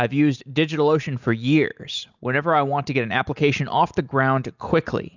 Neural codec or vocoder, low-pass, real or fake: none; 7.2 kHz; real